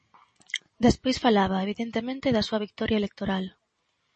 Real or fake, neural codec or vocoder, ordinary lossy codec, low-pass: real; none; MP3, 32 kbps; 10.8 kHz